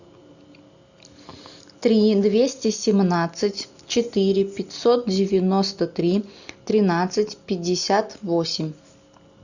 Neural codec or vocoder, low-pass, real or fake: none; 7.2 kHz; real